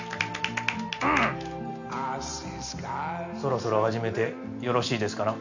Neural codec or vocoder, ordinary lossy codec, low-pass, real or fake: none; none; 7.2 kHz; real